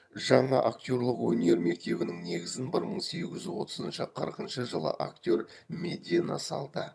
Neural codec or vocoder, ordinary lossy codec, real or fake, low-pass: vocoder, 22.05 kHz, 80 mel bands, HiFi-GAN; none; fake; none